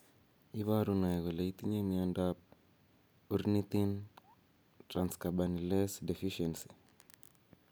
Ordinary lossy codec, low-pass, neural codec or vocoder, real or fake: none; none; none; real